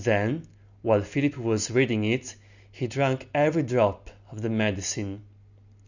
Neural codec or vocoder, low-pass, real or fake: none; 7.2 kHz; real